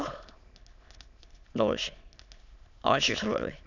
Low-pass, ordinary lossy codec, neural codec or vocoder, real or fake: 7.2 kHz; none; autoencoder, 22.05 kHz, a latent of 192 numbers a frame, VITS, trained on many speakers; fake